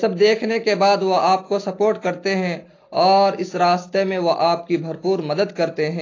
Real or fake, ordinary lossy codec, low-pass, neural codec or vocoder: real; AAC, 32 kbps; 7.2 kHz; none